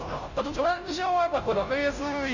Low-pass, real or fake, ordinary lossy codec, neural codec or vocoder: 7.2 kHz; fake; none; codec, 16 kHz, 0.5 kbps, FunCodec, trained on Chinese and English, 25 frames a second